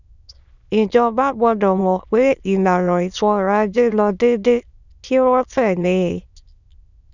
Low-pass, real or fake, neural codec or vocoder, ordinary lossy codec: 7.2 kHz; fake; autoencoder, 22.05 kHz, a latent of 192 numbers a frame, VITS, trained on many speakers; none